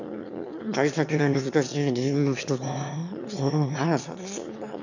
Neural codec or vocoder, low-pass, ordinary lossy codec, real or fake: autoencoder, 22.05 kHz, a latent of 192 numbers a frame, VITS, trained on one speaker; 7.2 kHz; none; fake